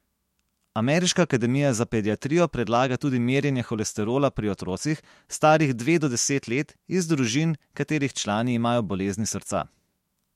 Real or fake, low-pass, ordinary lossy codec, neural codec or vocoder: fake; 19.8 kHz; MP3, 64 kbps; autoencoder, 48 kHz, 128 numbers a frame, DAC-VAE, trained on Japanese speech